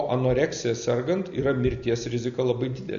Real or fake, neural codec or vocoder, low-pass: real; none; 7.2 kHz